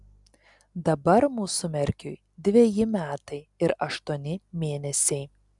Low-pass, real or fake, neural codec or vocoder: 10.8 kHz; real; none